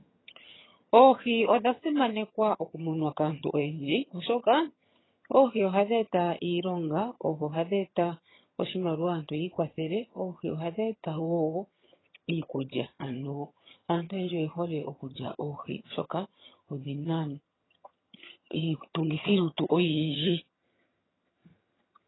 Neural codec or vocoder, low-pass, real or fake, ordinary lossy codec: vocoder, 22.05 kHz, 80 mel bands, HiFi-GAN; 7.2 kHz; fake; AAC, 16 kbps